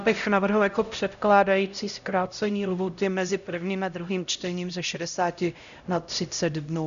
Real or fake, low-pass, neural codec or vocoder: fake; 7.2 kHz; codec, 16 kHz, 0.5 kbps, X-Codec, HuBERT features, trained on LibriSpeech